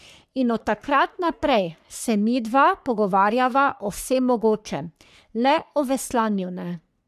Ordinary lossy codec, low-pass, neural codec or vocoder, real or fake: none; 14.4 kHz; codec, 44.1 kHz, 3.4 kbps, Pupu-Codec; fake